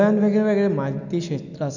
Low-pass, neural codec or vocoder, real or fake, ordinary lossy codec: 7.2 kHz; none; real; none